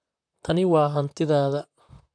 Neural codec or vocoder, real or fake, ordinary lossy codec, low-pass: vocoder, 44.1 kHz, 128 mel bands, Pupu-Vocoder; fake; none; 9.9 kHz